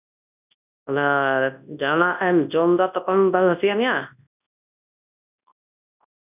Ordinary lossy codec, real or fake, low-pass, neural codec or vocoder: none; fake; 3.6 kHz; codec, 24 kHz, 0.9 kbps, WavTokenizer, large speech release